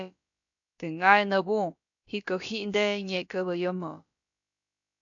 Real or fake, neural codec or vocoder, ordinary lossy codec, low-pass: fake; codec, 16 kHz, about 1 kbps, DyCAST, with the encoder's durations; AAC, 64 kbps; 7.2 kHz